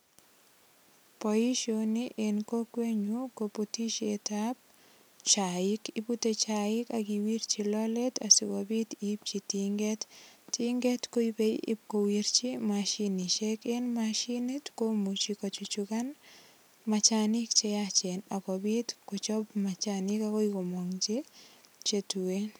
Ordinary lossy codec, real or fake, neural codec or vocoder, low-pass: none; real; none; none